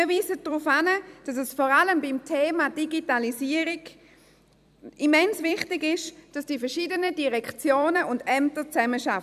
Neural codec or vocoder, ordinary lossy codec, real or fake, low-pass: vocoder, 44.1 kHz, 128 mel bands every 256 samples, BigVGAN v2; none; fake; 14.4 kHz